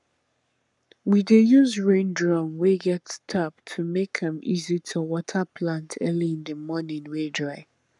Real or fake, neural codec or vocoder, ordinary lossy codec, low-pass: fake; codec, 44.1 kHz, 7.8 kbps, Pupu-Codec; none; 10.8 kHz